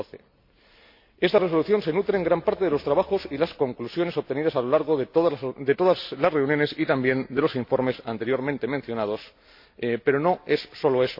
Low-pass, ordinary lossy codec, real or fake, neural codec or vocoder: 5.4 kHz; MP3, 32 kbps; real; none